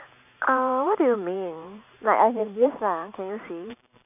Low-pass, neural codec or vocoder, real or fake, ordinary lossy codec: 3.6 kHz; vocoder, 44.1 kHz, 128 mel bands every 512 samples, BigVGAN v2; fake; none